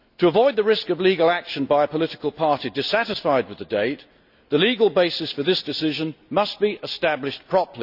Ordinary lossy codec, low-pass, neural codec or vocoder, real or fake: none; 5.4 kHz; none; real